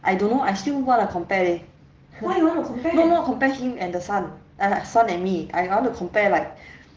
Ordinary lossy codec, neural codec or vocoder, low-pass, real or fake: Opus, 16 kbps; none; 7.2 kHz; real